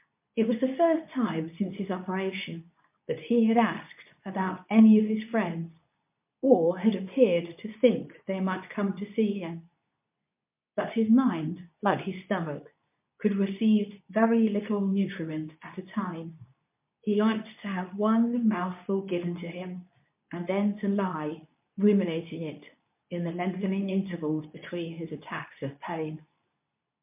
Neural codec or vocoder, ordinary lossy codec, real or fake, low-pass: codec, 24 kHz, 0.9 kbps, WavTokenizer, medium speech release version 2; MP3, 32 kbps; fake; 3.6 kHz